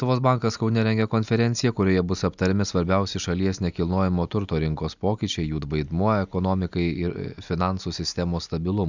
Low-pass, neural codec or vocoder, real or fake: 7.2 kHz; none; real